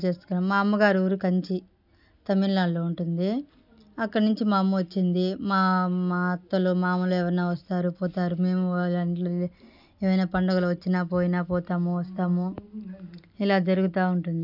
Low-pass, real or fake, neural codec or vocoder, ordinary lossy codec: 5.4 kHz; real; none; none